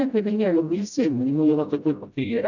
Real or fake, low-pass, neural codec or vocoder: fake; 7.2 kHz; codec, 16 kHz, 0.5 kbps, FreqCodec, smaller model